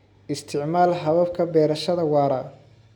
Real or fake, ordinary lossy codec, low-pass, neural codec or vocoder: real; none; 19.8 kHz; none